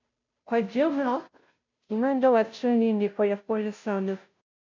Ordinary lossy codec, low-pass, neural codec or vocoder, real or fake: MP3, 64 kbps; 7.2 kHz; codec, 16 kHz, 0.5 kbps, FunCodec, trained on Chinese and English, 25 frames a second; fake